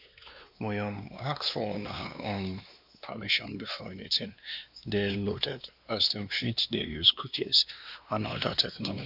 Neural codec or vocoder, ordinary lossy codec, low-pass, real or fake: codec, 16 kHz, 2 kbps, X-Codec, WavLM features, trained on Multilingual LibriSpeech; none; 5.4 kHz; fake